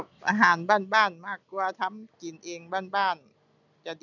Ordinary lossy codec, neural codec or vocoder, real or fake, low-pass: none; none; real; 7.2 kHz